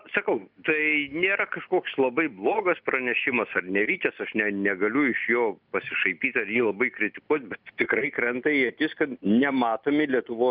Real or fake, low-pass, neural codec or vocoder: real; 5.4 kHz; none